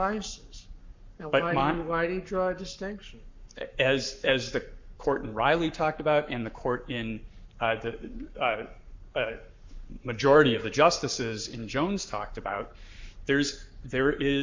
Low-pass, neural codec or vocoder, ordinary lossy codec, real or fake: 7.2 kHz; codec, 44.1 kHz, 7.8 kbps, Pupu-Codec; MP3, 64 kbps; fake